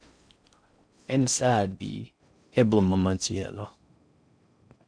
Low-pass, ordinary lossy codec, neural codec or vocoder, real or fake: 9.9 kHz; none; codec, 16 kHz in and 24 kHz out, 0.6 kbps, FocalCodec, streaming, 4096 codes; fake